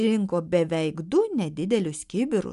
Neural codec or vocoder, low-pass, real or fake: none; 10.8 kHz; real